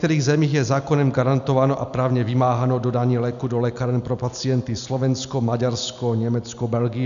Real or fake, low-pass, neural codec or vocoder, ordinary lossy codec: real; 7.2 kHz; none; MP3, 96 kbps